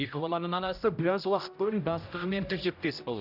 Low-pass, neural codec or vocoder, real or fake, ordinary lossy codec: 5.4 kHz; codec, 16 kHz, 0.5 kbps, X-Codec, HuBERT features, trained on general audio; fake; none